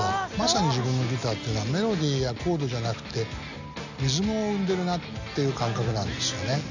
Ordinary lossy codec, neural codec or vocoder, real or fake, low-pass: none; none; real; 7.2 kHz